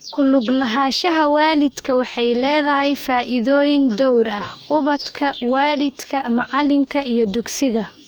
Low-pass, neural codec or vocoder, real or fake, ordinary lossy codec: none; codec, 44.1 kHz, 2.6 kbps, DAC; fake; none